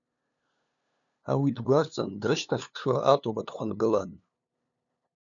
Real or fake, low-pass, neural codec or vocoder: fake; 7.2 kHz; codec, 16 kHz, 2 kbps, FunCodec, trained on LibriTTS, 25 frames a second